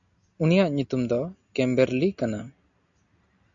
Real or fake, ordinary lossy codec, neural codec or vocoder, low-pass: real; MP3, 64 kbps; none; 7.2 kHz